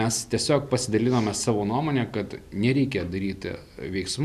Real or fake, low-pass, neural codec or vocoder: real; 14.4 kHz; none